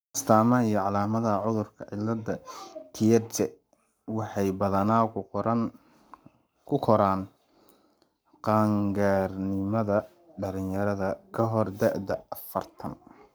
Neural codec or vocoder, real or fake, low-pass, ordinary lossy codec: codec, 44.1 kHz, 7.8 kbps, Pupu-Codec; fake; none; none